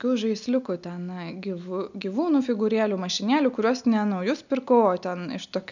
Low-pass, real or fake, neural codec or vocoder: 7.2 kHz; real; none